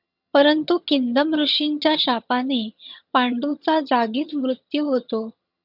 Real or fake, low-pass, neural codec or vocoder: fake; 5.4 kHz; vocoder, 22.05 kHz, 80 mel bands, HiFi-GAN